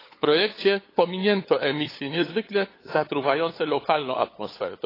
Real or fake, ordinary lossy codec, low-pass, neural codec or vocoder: fake; AAC, 24 kbps; 5.4 kHz; codec, 16 kHz, 8 kbps, FunCodec, trained on LibriTTS, 25 frames a second